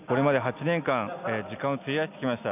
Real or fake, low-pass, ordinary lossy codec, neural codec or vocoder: real; 3.6 kHz; none; none